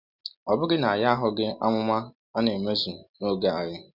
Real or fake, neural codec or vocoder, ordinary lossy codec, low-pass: real; none; none; 5.4 kHz